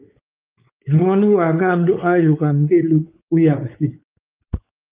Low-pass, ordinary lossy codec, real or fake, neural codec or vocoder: 3.6 kHz; Opus, 24 kbps; fake; codec, 16 kHz, 4 kbps, X-Codec, WavLM features, trained on Multilingual LibriSpeech